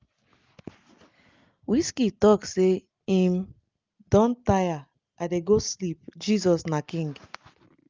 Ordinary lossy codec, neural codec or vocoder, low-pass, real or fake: Opus, 24 kbps; none; 7.2 kHz; real